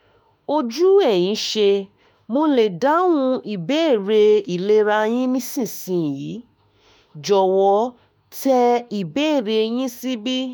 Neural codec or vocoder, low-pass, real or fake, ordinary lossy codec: autoencoder, 48 kHz, 32 numbers a frame, DAC-VAE, trained on Japanese speech; none; fake; none